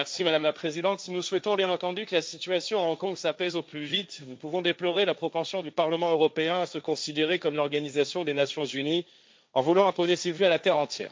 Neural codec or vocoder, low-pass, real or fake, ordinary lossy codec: codec, 16 kHz, 1.1 kbps, Voila-Tokenizer; none; fake; none